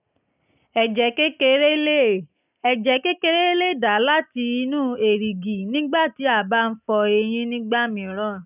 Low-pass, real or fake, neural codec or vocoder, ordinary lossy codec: 3.6 kHz; real; none; none